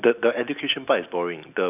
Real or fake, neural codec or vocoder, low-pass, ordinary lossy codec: real; none; 3.6 kHz; none